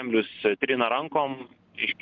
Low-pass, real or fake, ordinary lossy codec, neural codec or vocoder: 7.2 kHz; real; Opus, 24 kbps; none